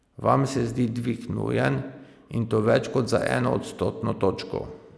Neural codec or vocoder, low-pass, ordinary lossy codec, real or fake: none; none; none; real